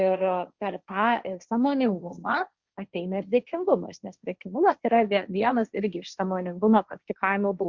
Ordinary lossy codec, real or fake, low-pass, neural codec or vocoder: Opus, 64 kbps; fake; 7.2 kHz; codec, 16 kHz, 1.1 kbps, Voila-Tokenizer